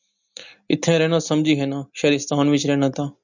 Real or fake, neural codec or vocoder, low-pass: real; none; 7.2 kHz